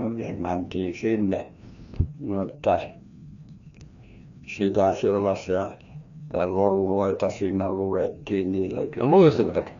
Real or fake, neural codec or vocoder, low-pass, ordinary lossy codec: fake; codec, 16 kHz, 1 kbps, FreqCodec, larger model; 7.2 kHz; Opus, 64 kbps